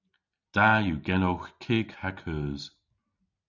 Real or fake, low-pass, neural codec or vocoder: real; 7.2 kHz; none